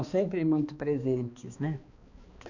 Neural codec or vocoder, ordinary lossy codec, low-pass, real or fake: codec, 16 kHz, 2 kbps, X-Codec, HuBERT features, trained on balanced general audio; none; 7.2 kHz; fake